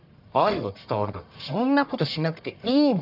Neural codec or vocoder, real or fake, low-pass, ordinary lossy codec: codec, 44.1 kHz, 1.7 kbps, Pupu-Codec; fake; 5.4 kHz; none